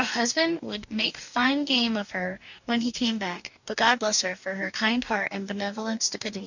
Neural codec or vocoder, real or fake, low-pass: codec, 44.1 kHz, 2.6 kbps, DAC; fake; 7.2 kHz